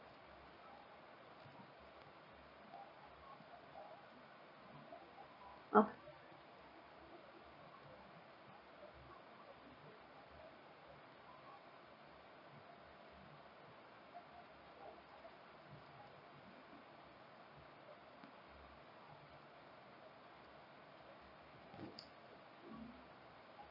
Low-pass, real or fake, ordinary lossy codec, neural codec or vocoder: 5.4 kHz; real; Opus, 64 kbps; none